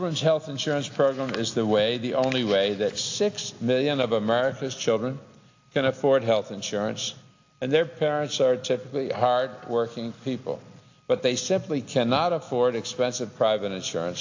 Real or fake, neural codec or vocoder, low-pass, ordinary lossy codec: real; none; 7.2 kHz; AAC, 48 kbps